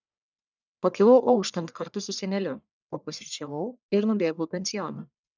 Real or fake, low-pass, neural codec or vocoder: fake; 7.2 kHz; codec, 44.1 kHz, 1.7 kbps, Pupu-Codec